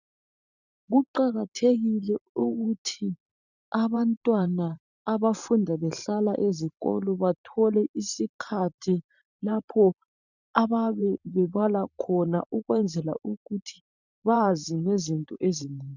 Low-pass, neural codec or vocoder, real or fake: 7.2 kHz; vocoder, 44.1 kHz, 128 mel bands every 512 samples, BigVGAN v2; fake